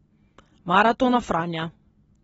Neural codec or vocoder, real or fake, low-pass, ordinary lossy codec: none; real; 10.8 kHz; AAC, 24 kbps